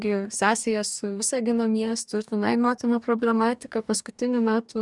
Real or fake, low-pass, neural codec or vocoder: fake; 10.8 kHz; codec, 44.1 kHz, 2.6 kbps, DAC